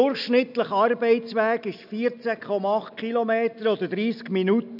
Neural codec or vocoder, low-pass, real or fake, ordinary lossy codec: none; 5.4 kHz; real; none